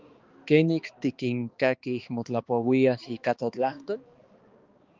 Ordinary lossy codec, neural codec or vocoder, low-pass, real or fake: Opus, 32 kbps; codec, 16 kHz, 2 kbps, X-Codec, HuBERT features, trained on balanced general audio; 7.2 kHz; fake